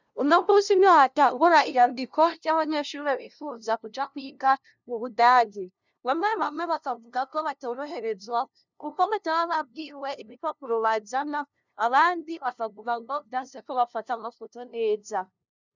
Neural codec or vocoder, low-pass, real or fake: codec, 16 kHz, 0.5 kbps, FunCodec, trained on LibriTTS, 25 frames a second; 7.2 kHz; fake